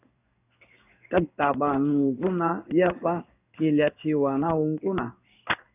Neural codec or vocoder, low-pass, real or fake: codec, 16 kHz in and 24 kHz out, 1 kbps, XY-Tokenizer; 3.6 kHz; fake